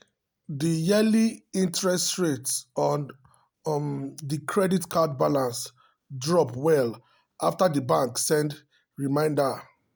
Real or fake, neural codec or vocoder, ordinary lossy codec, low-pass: real; none; none; none